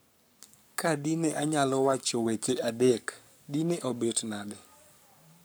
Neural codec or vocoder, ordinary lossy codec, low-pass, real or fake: codec, 44.1 kHz, 7.8 kbps, Pupu-Codec; none; none; fake